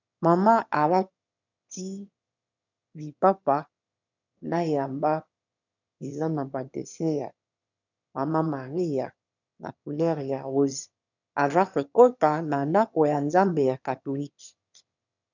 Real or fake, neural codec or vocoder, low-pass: fake; autoencoder, 22.05 kHz, a latent of 192 numbers a frame, VITS, trained on one speaker; 7.2 kHz